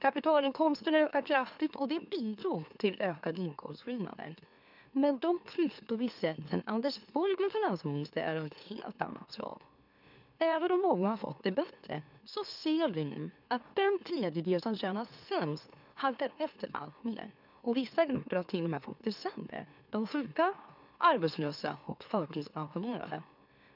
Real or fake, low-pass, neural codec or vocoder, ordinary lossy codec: fake; 5.4 kHz; autoencoder, 44.1 kHz, a latent of 192 numbers a frame, MeloTTS; none